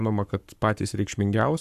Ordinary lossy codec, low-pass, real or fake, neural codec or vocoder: AAC, 96 kbps; 14.4 kHz; fake; codec, 44.1 kHz, 7.8 kbps, Pupu-Codec